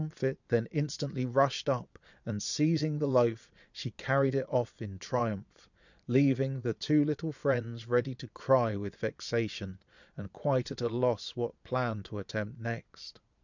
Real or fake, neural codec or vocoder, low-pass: fake; vocoder, 22.05 kHz, 80 mel bands, Vocos; 7.2 kHz